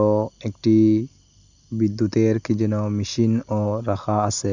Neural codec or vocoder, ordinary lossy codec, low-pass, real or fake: none; none; 7.2 kHz; real